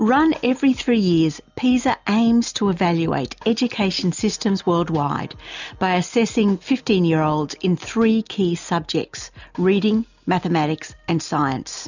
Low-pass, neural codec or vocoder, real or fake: 7.2 kHz; none; real